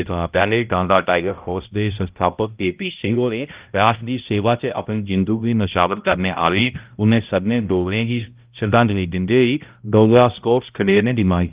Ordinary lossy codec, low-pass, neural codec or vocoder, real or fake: Opus, 32 kbps; 3.6 kHz; codec, 16 kHz, 0.5 kbps, X-Codec, HuBERT features, trained on balanced general audio; fake